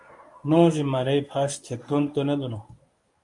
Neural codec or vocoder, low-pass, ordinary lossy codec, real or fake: codec, 24 kHz, 0.9 kbps, WavTokenizer, medium speech release version 1; 10.8 kHz; MP3, 64 kbps; fake